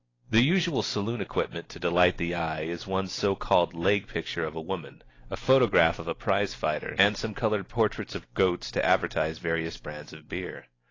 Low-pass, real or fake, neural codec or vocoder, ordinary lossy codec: 7.2 kHz; real; none; AAC, 32 kbps